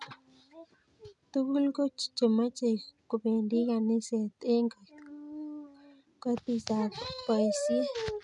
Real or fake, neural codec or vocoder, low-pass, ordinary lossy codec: real; none; 10.8 kHz; none